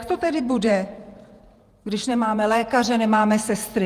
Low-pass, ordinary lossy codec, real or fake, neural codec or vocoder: 14.4 kHz; Opus, 32 kbps; fake; vocoder, 48 kHz, 128 mel bands, Vocos